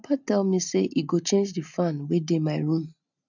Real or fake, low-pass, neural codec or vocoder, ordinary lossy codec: real; 7.2 kHz; none; none